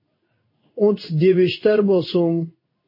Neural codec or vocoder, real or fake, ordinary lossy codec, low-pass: codec, 16 kHz in and 24 kHz out, 1 kbps, XY-Tokenizer; fake; MP3, 24 kbps; 5.4 kHz